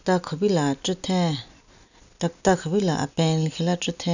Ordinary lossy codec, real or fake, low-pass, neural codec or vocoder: none; real; 7.2 kHz; none